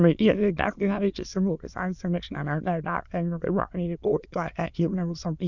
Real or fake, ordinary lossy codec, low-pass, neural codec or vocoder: fake; none; 7.2 kHz; autoencoder, 22.05 kHz, a latent of 192 numbers a frame, VITS, trained on many speakers